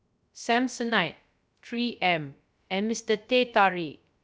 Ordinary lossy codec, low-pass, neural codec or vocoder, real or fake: none; none; codec, 16 kHz, 0.3 kbps, FocalCodec; fake